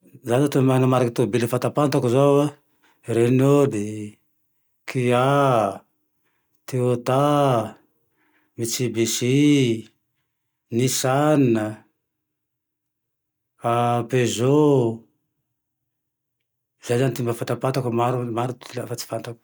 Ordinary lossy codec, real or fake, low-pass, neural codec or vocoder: none; real; none; none